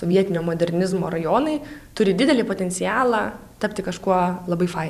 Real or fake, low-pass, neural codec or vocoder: fake; 14.4 kHz; vocoder, 44.1 kHz, 128 mel bands every 512 samples, BigVGAN v2